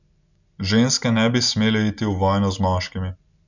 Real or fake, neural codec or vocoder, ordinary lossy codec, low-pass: real; none; none; 7.2 kHz